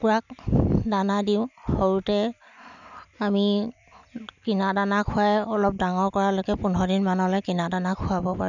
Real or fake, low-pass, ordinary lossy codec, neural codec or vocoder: real; 7.2 kHz; none; none